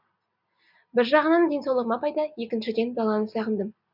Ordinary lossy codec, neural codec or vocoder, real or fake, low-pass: none; none; real; 5.4 kHz